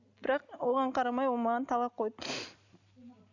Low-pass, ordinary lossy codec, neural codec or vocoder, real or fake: 7.2 kHz; none; none; real